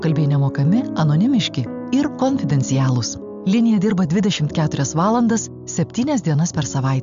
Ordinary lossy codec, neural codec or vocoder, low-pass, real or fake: MP3, 64 kbps; none; 7.2 kHz; real